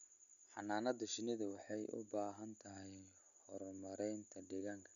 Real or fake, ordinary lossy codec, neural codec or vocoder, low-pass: real; none; none; 7.2 kHz